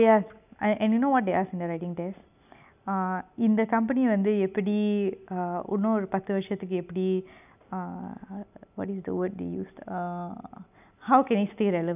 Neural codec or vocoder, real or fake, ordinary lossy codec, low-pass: none; real; none; 3.6 kHz